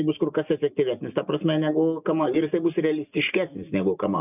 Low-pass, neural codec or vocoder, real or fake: 3.6 kHz; none; real